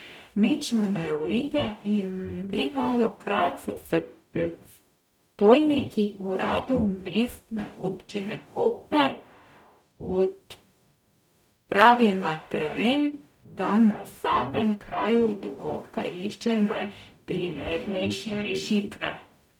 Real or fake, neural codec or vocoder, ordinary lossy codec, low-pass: fake; codec, 44.1 kHz, 0.9 kbps, DAC; none; 19.8 kHz